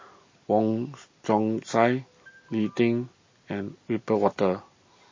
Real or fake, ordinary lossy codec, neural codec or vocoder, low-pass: real; MP3, 32 kbps; none; 7.2 kHz